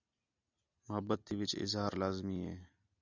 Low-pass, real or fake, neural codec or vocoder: 7.2 kHz; real; none